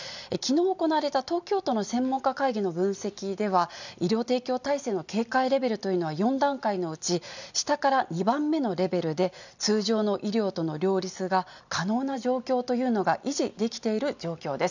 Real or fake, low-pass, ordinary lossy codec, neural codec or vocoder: real; 7.2 kHz; none; none